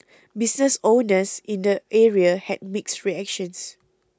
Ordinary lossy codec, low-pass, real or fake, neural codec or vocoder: none; none; real; none